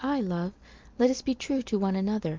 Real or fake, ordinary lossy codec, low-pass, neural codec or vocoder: real; Opus, 32 kbps; 7.2 kHz; none